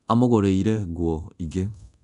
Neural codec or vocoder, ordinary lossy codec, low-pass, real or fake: codec, 24 kHz, 0.9 kbps, DualCodec; none; 10.8 kHz; fake